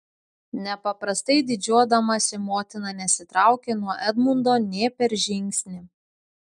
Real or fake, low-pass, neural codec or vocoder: real; 10.8 kHz; none